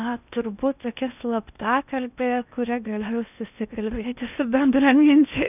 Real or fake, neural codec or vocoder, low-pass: fake; codec, 16 kHz in and 24 kHz out, 0.8 kbps, FocalCodec, streaming, 65536 codes; 3.6 kHz